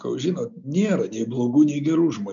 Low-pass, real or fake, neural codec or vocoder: 7.2 kHz; real; none